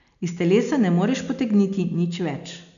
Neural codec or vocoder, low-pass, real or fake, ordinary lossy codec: none; 7.2 kHz; real; none